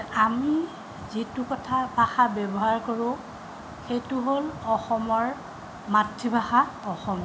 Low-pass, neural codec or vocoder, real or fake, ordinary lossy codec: none; none; real; none